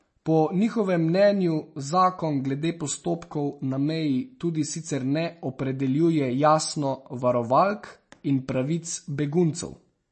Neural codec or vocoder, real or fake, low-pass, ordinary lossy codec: none; real; 10.8 kHz; MP3, 32 kbps